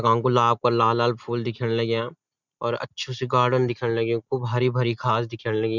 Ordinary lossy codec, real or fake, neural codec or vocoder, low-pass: none; real; none; 7.2 kHz